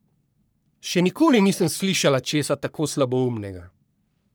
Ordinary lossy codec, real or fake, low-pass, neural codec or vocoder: none; fake; none; codec, 44.1 kHz, 3.4 kbps, Pupu-Codec